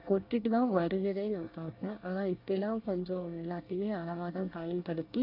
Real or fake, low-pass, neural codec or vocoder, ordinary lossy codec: fake; 5.4 kHz; codec, 24 kHz, 1 kbps, SNAC; AAC, 32 kbps